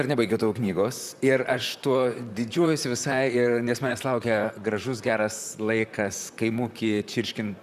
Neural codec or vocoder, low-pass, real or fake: vocoder, 44.1 kHz, 128 mel bands, Pupu-Vocoder; 14.4 kHz; fake